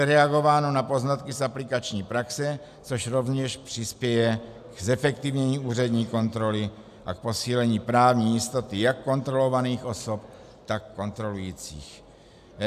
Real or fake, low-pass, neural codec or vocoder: real; 14.4 kHz; none